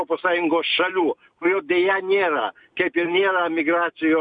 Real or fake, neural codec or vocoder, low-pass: real; none; 9.9 kHz